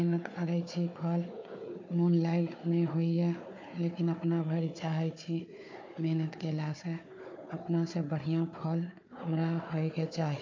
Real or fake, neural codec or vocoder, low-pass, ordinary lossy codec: fake; codec, 16 kHz, 4 kbps, FunCodec, trained on Chinese and English, 50 frames a second; 7.2 kHz; MP3, 48 kbps